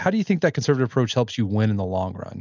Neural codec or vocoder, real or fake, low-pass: none; real; 7.2 kHz